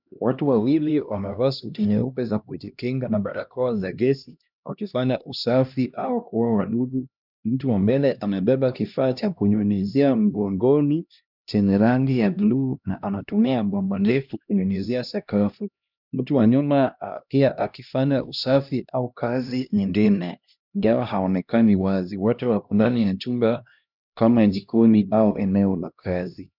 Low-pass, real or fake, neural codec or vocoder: 5.4 kHz; fake; codec, 16 kHz, 1 kbps, X-Codec, HuBERT features, trained on LibriSpeech